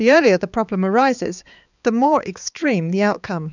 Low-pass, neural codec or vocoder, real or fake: 7.2 kHz; codec, 16 kHz, 4 kbps, X-Codec, HuBERT features, trained on balanced general audio; fake